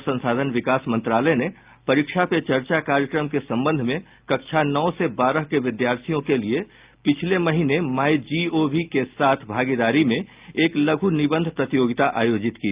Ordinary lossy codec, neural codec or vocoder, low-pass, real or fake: Opus, 24 kbps; none; 3.6 kHz; real